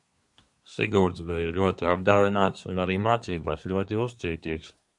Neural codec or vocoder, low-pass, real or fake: codec, 24 kHz, 1 kbps, SNAC; 10.8 kHz; fake